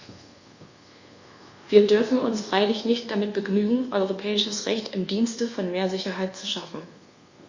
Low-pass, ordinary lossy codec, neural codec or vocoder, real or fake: 7.2 kHz; Opus, 64 kbps; codec, 24 kHz, 1.2 kbps, DualCodec; fake